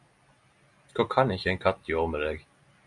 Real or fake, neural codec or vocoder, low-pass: real; none; 10.8 kHz